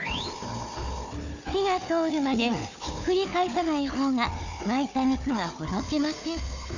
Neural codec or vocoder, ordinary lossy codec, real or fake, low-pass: codec, 16 kHz, 4 kbps, FunCodec, trained on Chinese and English, 50 frames a second; none; fake; 7.2 kHz